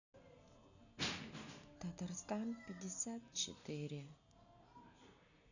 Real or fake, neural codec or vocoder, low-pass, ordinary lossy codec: real; none; 7.2 kHz; none